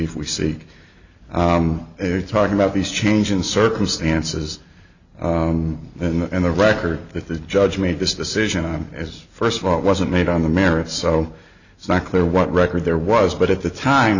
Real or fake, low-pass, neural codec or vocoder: real; 7.2 kHz; none